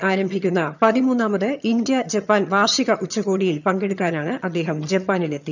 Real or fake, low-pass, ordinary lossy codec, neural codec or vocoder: fake; 7.2 kHz; none; vocoder, 22.05 kHz, 80 mel bands, HiFi-GAN